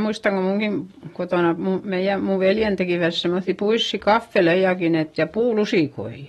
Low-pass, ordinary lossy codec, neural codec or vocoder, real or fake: 19.8 kHz; AAC, 32 kbps; none; real